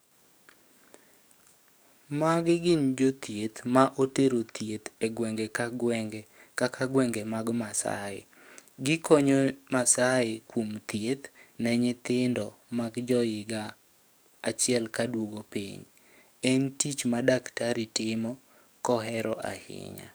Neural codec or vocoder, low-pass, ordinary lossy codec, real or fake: codec, 44.1 kHz, 7.8 kbps, DAC; none; none; fake